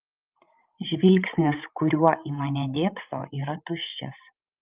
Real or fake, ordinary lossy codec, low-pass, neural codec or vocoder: fake; Opus, 24 kbps; 3.6 kHz; codec, 16 kHz, 16 kbps, FreqCodec, larger model